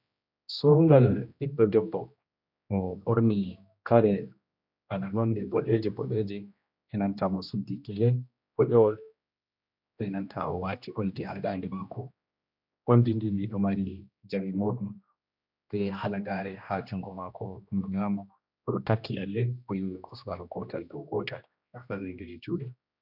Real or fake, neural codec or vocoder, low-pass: fake; codec, 16 kHz, 1 kbps, X-Codec, HuBERT features, trained on general audio; 5.4 kHz